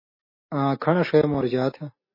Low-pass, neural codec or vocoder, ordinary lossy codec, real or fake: 5.4 kHz; none; MP3, 24 kbps; real